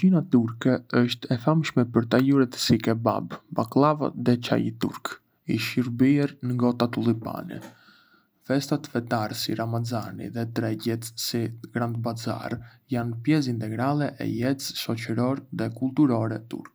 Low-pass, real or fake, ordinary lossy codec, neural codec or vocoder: none; real; none; none